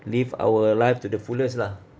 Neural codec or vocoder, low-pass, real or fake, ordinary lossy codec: none; none; real; none